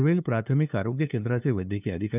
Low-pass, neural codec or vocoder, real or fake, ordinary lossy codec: 3.6 kHz; codec, 16 kHz, 2 kbps, FunCodec, trained on LibriTTS, 25 frames a second; fake; none